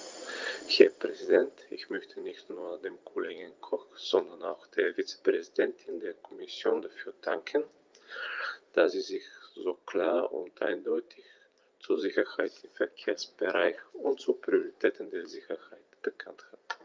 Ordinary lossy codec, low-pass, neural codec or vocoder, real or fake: Opus, 24 kbps; 7.2 kHz; vocoder, 44.1 kHz, 80 mel bands, Vocos; fake